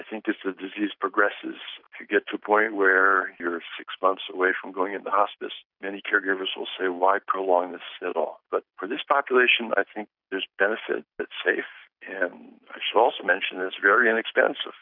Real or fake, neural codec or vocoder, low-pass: real; none; 5.4 kHz